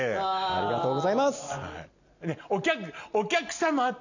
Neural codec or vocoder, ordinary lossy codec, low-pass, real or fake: none; none; 7.2 kHz; real